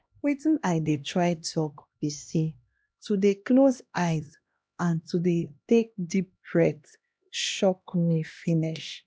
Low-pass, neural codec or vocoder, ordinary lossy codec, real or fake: none; codec, 16 kHz, 1 kbps, X-Codec, HuBERT features, trained on LibriSpeech; none; fake